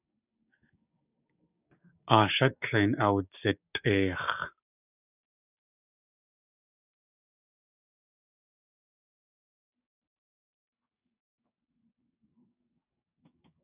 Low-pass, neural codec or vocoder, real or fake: 3.6 kHz; codec, 16 kHz, 6 kbps, DAC; fake